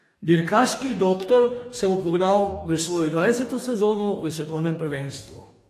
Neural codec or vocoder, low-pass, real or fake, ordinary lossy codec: codec, 44.1 kHz, 2.6 kbps, DAC; 14.4 kHz; fake; AAC, 64 kbps